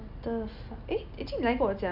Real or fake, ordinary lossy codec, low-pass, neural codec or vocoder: real; none; 5.4 kHz; none